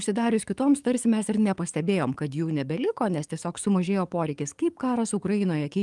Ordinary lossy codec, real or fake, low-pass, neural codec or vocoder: Opus, 32 kbps; fake; 10.8 kHz; codec, 44.1 kHz, 7.8 kbps, DAC